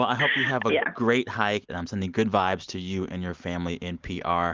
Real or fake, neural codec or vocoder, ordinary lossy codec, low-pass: real; none; Opus, 32 kbps; 7.2 kHz